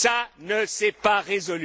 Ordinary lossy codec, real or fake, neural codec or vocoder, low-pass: none; real; none; none